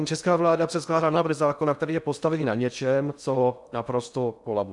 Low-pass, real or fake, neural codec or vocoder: 10.8 kHz; fake; codec, 16 kHz in and 24 kHz out, 0.6 kbps, FocalCodec, streaming, 2048 codes